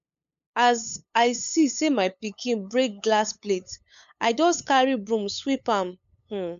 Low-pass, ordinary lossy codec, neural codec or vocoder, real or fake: 7.2 kHz; AAC, 96 kbps; codec, 16 kHz, 8 kbps, FunCodec, trained on LibriTTS, 25 frames a second; fake